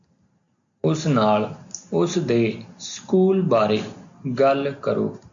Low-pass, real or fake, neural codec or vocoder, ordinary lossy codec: 7.2 kHz; real; none; MP3, 64 kbps